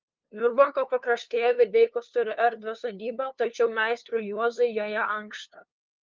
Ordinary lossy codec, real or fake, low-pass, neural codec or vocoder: Opus, 32 kbps; fake; 7.2 kHz; codec, 16 kHz, 2 kbps, FunCodec, trained on LibriTTS, 25 frames a second